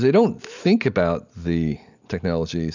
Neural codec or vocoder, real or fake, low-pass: none; real; 7.2 kHz